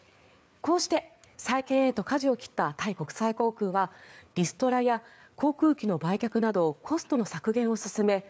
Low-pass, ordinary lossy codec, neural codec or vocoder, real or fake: none; none; codec, 16 kHz, 4 kbps, FreqCodec, larger model; fake